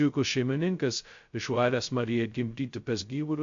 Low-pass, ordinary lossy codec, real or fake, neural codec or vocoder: 7.2 kHz; MP3, 48 kbps; fake; codec, 16 kHz, 0.2 kbps, FocalCodec